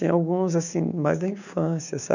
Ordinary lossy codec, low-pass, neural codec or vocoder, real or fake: none; 7.2 kHz; codec, 16 kHz, 6 kbps, DAC; fake